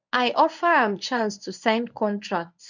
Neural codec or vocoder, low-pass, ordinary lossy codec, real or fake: codec, 24 kHz, 0.9 kbps, WavTokenizer, medium speech release version 1; 7.2 kHz; none; fake